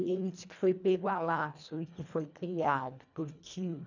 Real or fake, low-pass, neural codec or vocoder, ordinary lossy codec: fake; 7.2 kHz; codec, 24 kHz, 1.5 kbps, HILCodec; none